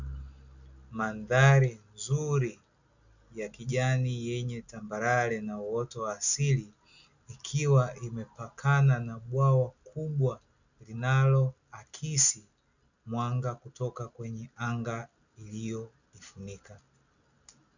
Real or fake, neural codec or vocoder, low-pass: real; none; 7.2 kHz